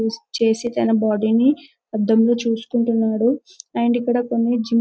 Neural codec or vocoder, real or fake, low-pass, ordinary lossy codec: none; real; none; none